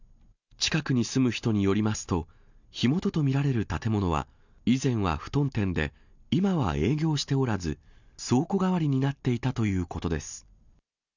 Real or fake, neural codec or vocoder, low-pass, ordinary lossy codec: real; none; 7.2 kHz; none